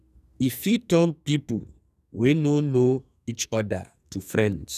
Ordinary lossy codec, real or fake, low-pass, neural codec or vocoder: none; fake; 14.4 kHz; codec, 44.1 kHz, 2.6 kbps, SNAC